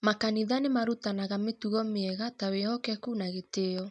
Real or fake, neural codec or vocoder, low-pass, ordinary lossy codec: real; none; none; none